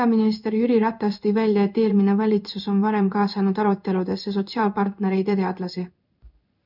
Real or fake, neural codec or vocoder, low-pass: fake; codec, 16 kHz in and 24 kHz out, 1 kbps, XY-Tokenizer; 5.4 kHz